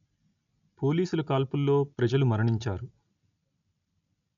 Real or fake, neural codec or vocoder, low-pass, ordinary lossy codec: real; none; 7.2 kHz; none